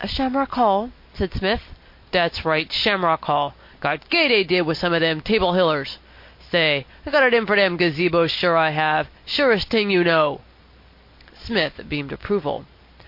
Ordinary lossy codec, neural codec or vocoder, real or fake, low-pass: MP3, 32 kbps; none; real; 5.4 kHz